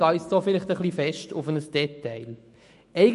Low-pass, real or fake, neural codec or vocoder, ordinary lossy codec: 14.4 kHz; fake; autoencoder, 48 kHz, 128 numbers a frame, DAC-VAE, trained on Japanese speech; MP3, 48 kbps